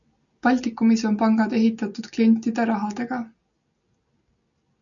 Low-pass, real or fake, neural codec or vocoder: 7.2 kHz; real; none